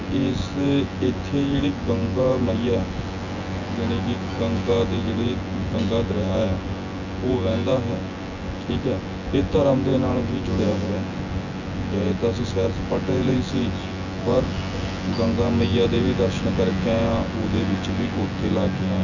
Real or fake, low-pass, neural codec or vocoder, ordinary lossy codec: fake; 7.2 kHz; vocoder, 24 kHz, 100 mel bands, Vocos; none